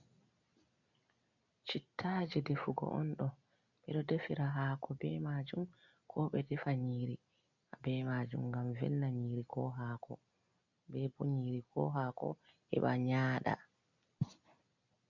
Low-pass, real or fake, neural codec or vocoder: 7.2 kHz; real; none